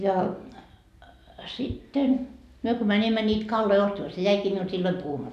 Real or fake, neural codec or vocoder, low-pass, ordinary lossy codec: real; none; 14.4 kHz; none